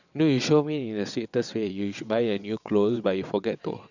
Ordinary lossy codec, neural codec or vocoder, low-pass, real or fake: none; vocoder, 22.05 kHz, 80 mel bands, WaveNeXt; 7.2 kHz; fake